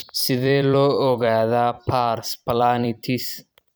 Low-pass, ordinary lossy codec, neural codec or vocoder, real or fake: none; none; vocoder, 44.1 kHz, 128 mel bands every 256 samples, BigVGAN v2; fake